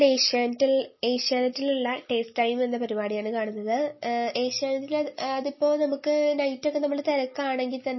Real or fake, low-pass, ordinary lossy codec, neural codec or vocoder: real; 7.2 kHz; MP3, 24 kbps; none